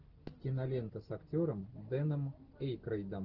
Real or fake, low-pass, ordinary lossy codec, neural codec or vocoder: real; 5.4 kHz; Opus, 16 kbps; none